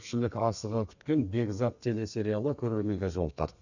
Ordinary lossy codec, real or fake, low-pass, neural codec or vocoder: none; fake; 7.2 kHz; codec, 32 kHz, 1.9 kbps, SNAC